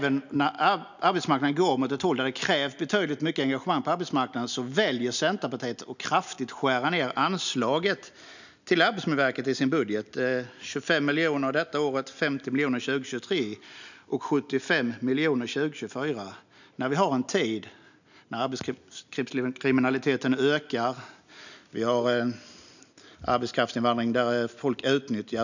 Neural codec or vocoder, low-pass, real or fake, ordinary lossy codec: none; 7.2 kHz; real; none